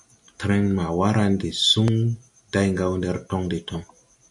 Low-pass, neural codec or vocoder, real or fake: 10.8 kHz; none; real